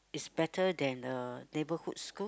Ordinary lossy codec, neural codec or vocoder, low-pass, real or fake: none; none; none; real